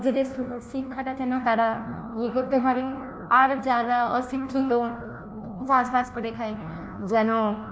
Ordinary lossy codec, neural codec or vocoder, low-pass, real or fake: none; codec, 16 kHz, 1 kbps, FunCodec, trained on LibriTTS, 50 frames a second; none; fake